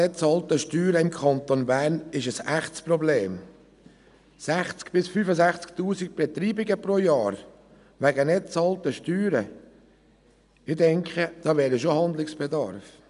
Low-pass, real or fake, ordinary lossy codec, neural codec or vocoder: 10.8 kHz; real; none; none